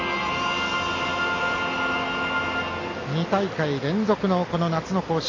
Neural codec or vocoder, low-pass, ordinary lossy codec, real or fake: none; 7.2 kHz; AAC, 32 kbps; real